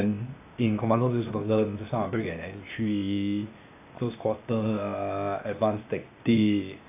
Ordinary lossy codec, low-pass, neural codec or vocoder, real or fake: AAC, 24 kbps; 3.6 kHz; codec, 16 kHz, 0.8 kbps, ZipCodec; fake